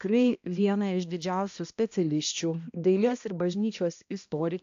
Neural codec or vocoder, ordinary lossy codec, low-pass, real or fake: codec, 16 kHz, 1 kbps, X-Codec, HuBERT features, trained on balanced general audio; AAC, 64 kbps; 7.2 kHz; fake